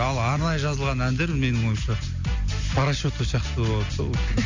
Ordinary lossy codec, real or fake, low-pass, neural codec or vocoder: MP3, 64 kbps; real; 7.2 kHz; none